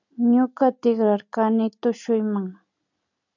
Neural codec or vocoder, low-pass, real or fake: none; 7.2 kHz; real